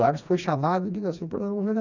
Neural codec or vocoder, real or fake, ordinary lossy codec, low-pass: codec, 16 kHz, 2 kbps, FreqCodec, smaller model; fake; none; 7.2 kHz